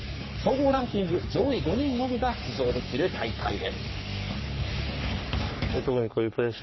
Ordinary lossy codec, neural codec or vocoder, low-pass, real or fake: MP3, 24 kbps; codec, 44.1 kHz, 3.4 kbps, Pupu-Codec; 7.2 kHz; fake